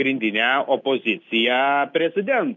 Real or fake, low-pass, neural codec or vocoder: real; 7.2 kHz; none